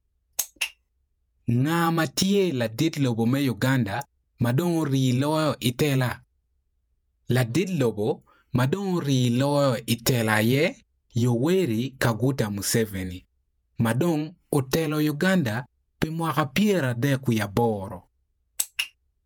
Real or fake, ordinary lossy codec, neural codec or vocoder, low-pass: fake; none; vocoder, 48 kHz, 128 mel bands, Vocos; none